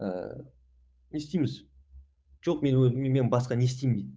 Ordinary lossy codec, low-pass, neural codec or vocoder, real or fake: none; none; codec, 16 kHz, 8 kbps, FunCodec, trained on Chinese and English, 25 frames a second; fake